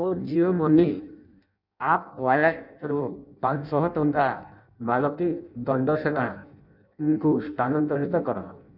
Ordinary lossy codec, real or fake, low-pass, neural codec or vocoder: none; fake; 5.4 kHz; codec, 16 kHz in and 24 kHz out, 0.6 kbps, FireRedTTS-2 codec